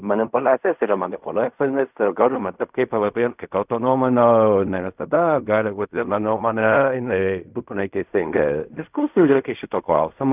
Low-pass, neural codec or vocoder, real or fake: 3.6 kHz; codec, 16 kHz in and 24 kHz out, 0.4 kbps, LongCat-Audio-Codec, fine tuned four codebook decoder; fake